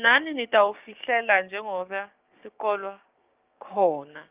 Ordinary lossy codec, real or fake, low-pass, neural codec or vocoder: Opus, 32 kbps; fake; 3.6 kHz; codec, 16 kHz, 6 kbps, DAC